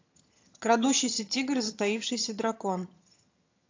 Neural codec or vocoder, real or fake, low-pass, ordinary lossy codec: vocoder, 22.05 kHz, 80 mel bands, HiFi-GAN; fake; 7.2 kHz; AAC, 48 kbps